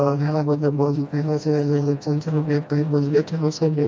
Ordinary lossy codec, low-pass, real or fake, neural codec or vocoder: none; none; fake; codec, 16 kHz, 1 kbps, FreqCodec, smaller model